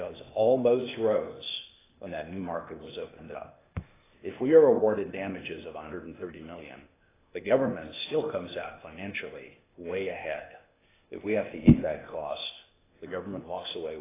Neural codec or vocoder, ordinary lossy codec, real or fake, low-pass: codec, 16 kHz, 0.8 kbps, ZipCodec; AAC, 16 kbps; fake; 3.6 kHz